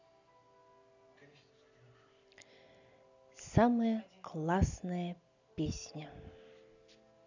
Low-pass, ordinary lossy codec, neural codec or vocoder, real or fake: 7.2 kHz; none; none; real